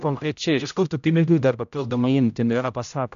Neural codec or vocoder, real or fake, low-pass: codec, 16 kHz, 0.5 kbps, X-Codec, HuBERT features, trained on general audio; fake; 7.2 kHz